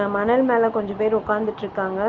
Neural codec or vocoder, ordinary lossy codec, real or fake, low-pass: none; Opus, 24 kbps; real; 7.2 kHz